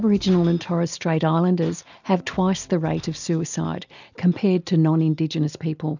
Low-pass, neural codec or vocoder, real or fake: 7.2 kHz; none; real